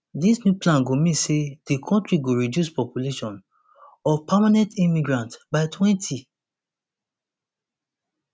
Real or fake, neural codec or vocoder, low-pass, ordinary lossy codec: real; none; none; none